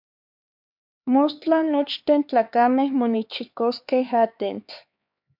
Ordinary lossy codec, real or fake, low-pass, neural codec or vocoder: MP3, 48 kbps; fake; 5.4 kHz; codec, 16 kHz, 4 kbps, X-Codec, HuBERT features, trained on LibriSpeech